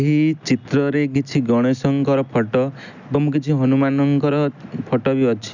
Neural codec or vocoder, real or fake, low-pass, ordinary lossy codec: none; real; 7.2 kHz; none